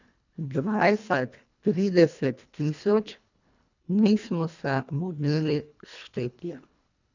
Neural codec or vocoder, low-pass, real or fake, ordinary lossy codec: codec, 24 kHz, 1.5 kbps, HILCodec; 7.2 kHz; fake; none